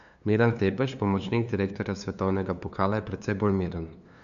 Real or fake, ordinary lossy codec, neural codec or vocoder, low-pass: fake; none; codec, 16 kHz, 2 kbps, FunCodec, trained on LibriTTS, 25 frames a second; 7.2 kHz